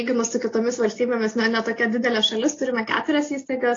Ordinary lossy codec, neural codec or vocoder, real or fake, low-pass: AAC, 32 kbps; none; real; 7.2 kHz